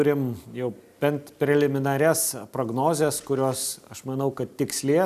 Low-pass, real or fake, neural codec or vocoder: 14.4 kHz; real; none